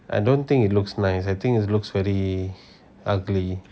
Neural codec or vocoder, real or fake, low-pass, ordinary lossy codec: none; real; none; none